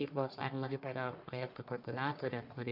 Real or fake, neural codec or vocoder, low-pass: fake; codec, 44.1 kHz, 1.7 kbps, Pupu-Codec; 5.4 kHz